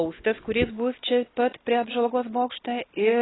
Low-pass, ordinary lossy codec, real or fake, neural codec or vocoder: 7.2 kHz; AAC, 16 kbps; real; none